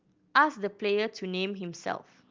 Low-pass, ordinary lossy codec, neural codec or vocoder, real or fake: 7.2 kHz; Opus, 24 kbps; none; real